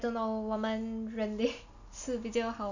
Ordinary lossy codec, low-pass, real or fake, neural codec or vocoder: none; 7.2 kHz; real; none